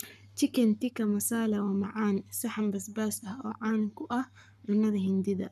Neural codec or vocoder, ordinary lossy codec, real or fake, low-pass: codec, 44.1 kHz, 7.8 kbps, Pupu-Codec; none; fake; 14.4 kHz